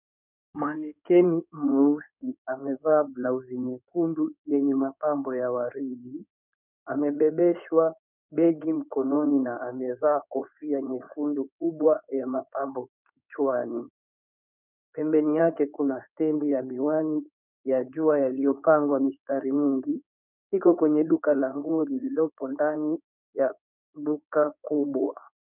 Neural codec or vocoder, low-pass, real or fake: codec, 16 kHz in and 24 kHz out, 2.2 kbps, FireRedTTS-2 codec; 3.6 kHz; fake